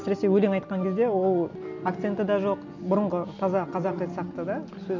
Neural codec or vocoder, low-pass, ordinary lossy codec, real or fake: none; 7.2 kHz; none; real